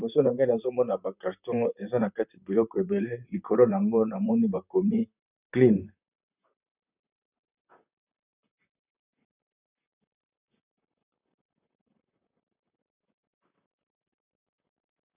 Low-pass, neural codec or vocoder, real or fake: 3.6 kHz; vocoder, 44.1 kHz, 128 mel bands, Pupu-Vocoder; fake